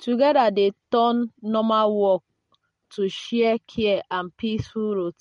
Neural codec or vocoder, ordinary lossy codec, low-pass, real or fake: none; MP3, 48 kbps; 14.4 kHz; real